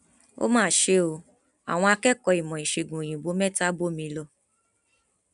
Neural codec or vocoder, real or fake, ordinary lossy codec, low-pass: none; real; none; 10.8 kHz